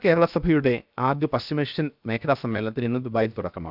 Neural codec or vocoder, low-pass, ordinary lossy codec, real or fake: codec, 16 kHz, 0.7 kbps, FocalCodec; 5.4 kHz; none; fake